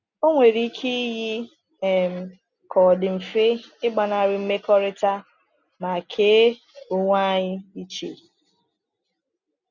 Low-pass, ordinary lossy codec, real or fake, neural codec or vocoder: 7.2 kHz; none; real; none